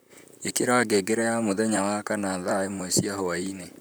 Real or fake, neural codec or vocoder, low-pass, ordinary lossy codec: fake; vocoder, 44.1 kHz, 128 mel bands, Pupu-Vocoder; none; none